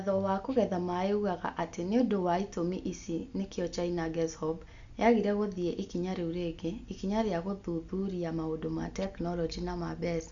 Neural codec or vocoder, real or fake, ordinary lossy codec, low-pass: none; real; Opus, 64 kbps; 7.2 kHz